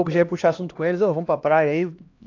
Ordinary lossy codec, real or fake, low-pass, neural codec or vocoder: AAC, 48 kbps; fake; 7.2 kHz; codec, 16 kHz, 1 kbps, X-Codec, HuBERT features, trained on LibriSpeech